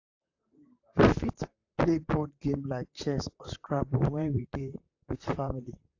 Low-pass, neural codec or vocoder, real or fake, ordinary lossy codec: 7.2 kHz; vocoder, 44.1 kHz, 128 mel bands, Pupu-Vocoder; fake; AAC, 48 kbps